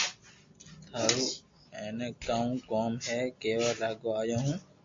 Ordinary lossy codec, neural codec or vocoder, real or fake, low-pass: AAC, 48 kbps; none; real; 7.2 kHz